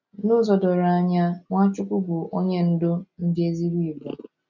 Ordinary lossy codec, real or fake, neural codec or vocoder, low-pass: none; real; none; 7.2 kHz